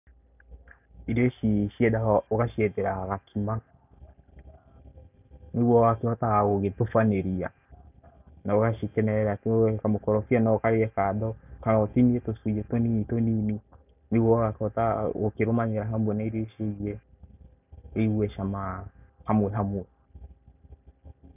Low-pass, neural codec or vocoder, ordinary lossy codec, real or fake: 3.6 kHz; none; none; real